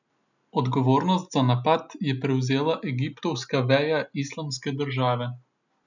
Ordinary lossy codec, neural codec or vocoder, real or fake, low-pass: none; none; real; 7.2 kHz